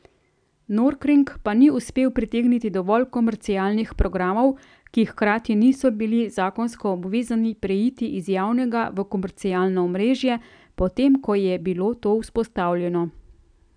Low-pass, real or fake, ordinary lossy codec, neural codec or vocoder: 9.9 kHz; real; none; none